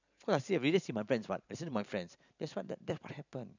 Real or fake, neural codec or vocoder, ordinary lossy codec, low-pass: fake; vocoder, 44.1 kHz, 128 mel bands every 512 samples, BigVGAN v2; none; 7.2 kHz